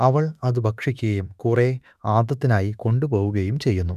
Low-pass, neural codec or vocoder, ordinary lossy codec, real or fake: 14.4 kHz; autoencoder, 48 kHz, 32 numbers a frame, DAC-VAE, trained on Japanese speech; none; fake